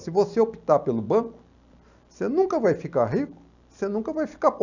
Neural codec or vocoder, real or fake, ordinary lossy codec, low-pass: none; real; none; 7.2 kHz